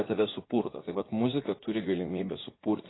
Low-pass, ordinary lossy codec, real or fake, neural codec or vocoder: 7.2 kHz; AAC, 16 kbps; fake; vocoder, 44.1 kHz, 80 mel bands, Vocos